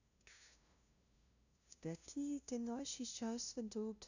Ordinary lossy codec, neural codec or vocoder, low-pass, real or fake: none; codec, 16 kHz, 0.5 kbps, FunCodec, trained on LibriTTS, 25 frames a second; 7.2 kHz; fake